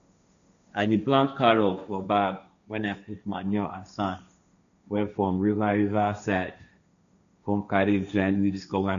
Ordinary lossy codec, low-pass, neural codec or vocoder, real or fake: none; 7.2 kHz; codec, 16 kHz, 1.1 kbps, Voila-Tokenizer; fake